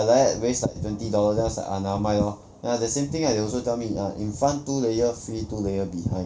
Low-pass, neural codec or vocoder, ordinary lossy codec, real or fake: none; none; none; real